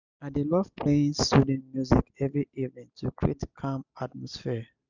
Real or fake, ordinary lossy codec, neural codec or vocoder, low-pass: real; none; none; 7.2 kHz